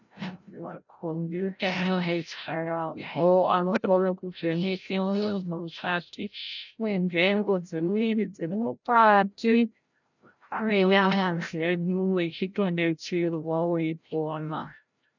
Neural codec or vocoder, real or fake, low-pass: codec, 16 kHz, 0.5 kbps, FreqCodec, larger model; fake; 7.2 kHz